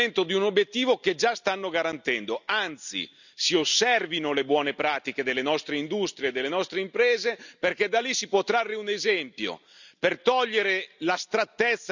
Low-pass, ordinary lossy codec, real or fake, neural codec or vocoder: 7.2 kHz; none; real; none